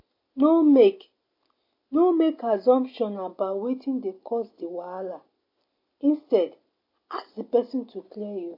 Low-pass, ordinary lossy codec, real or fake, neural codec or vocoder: 5.4 kHz; MP3, 32 kbps; real; none